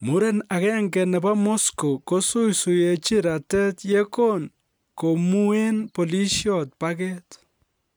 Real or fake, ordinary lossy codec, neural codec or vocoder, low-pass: real; none; none; none